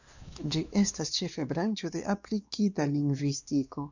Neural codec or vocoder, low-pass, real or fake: codec, 16 kHz, 2 kbps, X-Codec, WavLM features, trained on Multilingual LibriSpeech; 7.2 kHz; fake